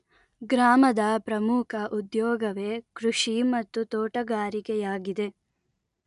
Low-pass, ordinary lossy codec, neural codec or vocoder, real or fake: 10.8 kHz; none; none; real